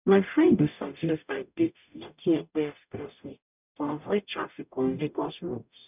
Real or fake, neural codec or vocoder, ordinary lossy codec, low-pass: fake; codec, 44.1 kHz, 0.9 kbps, DAC; none; 3.6 kHz